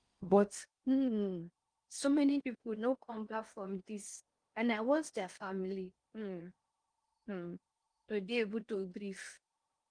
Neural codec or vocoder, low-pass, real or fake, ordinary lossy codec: codec, 16 kHz in and 24 kHz out, 0.6 kbps, FocalCodec, streaming, 4096 codes; 9.9 kHz; fake; Opus, 32 kbps